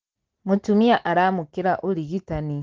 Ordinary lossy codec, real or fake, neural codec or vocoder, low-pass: Opus, 16 kbps; real; none; 7.2 kHz